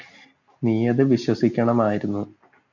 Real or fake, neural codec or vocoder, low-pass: real; none; 7.2 kHz